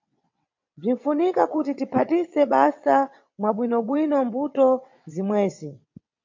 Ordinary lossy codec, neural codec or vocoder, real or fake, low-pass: MP3, 48 kbps; vocoder, 22.05 kHz, 80 mel bands, WaveNeXt; fake; 7.2 kHz